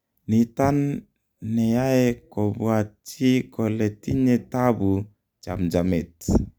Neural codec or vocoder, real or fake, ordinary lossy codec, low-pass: none; real; none; none